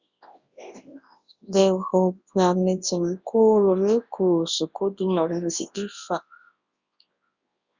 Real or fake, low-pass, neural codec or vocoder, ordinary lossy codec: fake; 7.2 kHz; codec, 24 kHz, 0.9 kbps, WavTokenizer, large speech release; Opus, 64 kbps